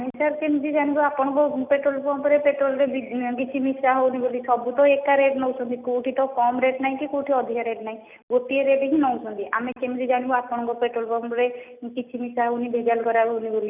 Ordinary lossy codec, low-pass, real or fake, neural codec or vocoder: none; 3.6 kHz; real; none